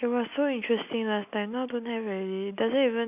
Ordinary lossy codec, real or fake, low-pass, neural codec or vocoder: none; real; 3.6 kHz; none